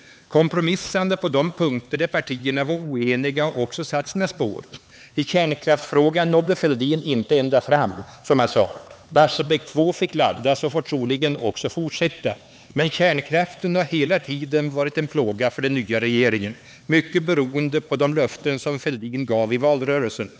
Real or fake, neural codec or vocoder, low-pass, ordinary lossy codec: fake; codec, 16 kHz, 4 kbps, X-Codec, HuBERT features, trained on LibriSpeech; none; none